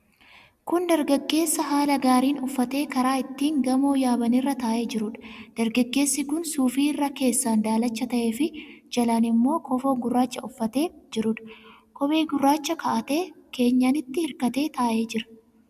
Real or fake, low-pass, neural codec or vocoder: real; 14.4 kHz; none